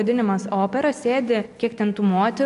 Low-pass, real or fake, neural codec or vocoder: 10.8 kHz; real; none